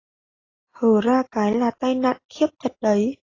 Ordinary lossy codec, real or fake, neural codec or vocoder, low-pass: AAC, 32 kbps; real; none; 7.2 kHz